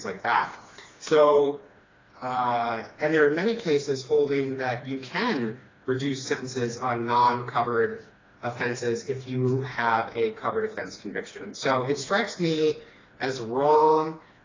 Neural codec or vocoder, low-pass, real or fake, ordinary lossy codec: codec, 16 kHz, 2 kbps, FreqCodec, smaller model; 7.2 kHz; fake; AAC, 32 kbps